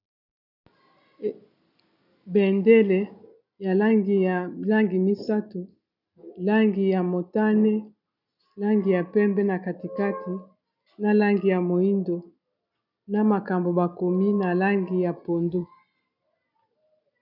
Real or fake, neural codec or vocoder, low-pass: real; none; 5.4 kHz